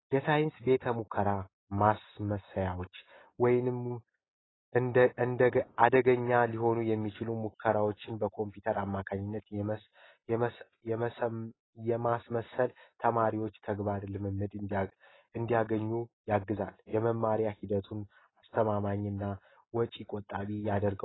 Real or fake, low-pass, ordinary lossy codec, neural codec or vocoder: real; 7.2 kHz; AAC, 16 kbps; none